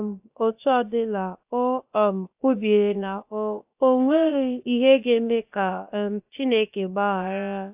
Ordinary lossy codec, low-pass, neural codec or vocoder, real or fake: AAC, 32 kbps; 3.6 kHz; codec, 16 kHz, about 1 kbps, DyCAST, with the encoder's durations; fake